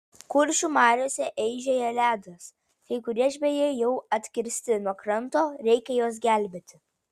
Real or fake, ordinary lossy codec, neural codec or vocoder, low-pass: real; Opus, 64 kbps; none; 14.4 kHz